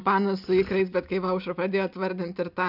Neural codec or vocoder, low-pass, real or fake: none; 5.4 kHz; real